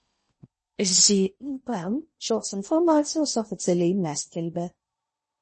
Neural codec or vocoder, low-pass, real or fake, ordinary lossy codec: codec, 16 kHz in and 24 kHz out, 0.6 kbps, FocalCodec, streaming, 4096 codes; 10.8 kHz; fake; MP3, 32 kbps